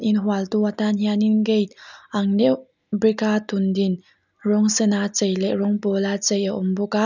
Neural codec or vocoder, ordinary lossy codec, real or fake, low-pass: none; none; real; 7.2 kHz